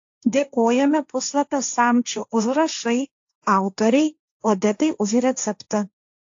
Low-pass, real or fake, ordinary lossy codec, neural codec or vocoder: 7.2 kHz; fake; AAC, 48 kbps; codec, 16 kHz, 1.1 kbps, Voila-Tokenizer